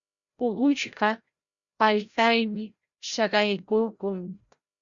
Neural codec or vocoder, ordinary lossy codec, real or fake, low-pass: codec, 16 kHz, 0.5 kbps, FreqCodec, larger model; Opus, 64 kbps; fake; 7.2 kHz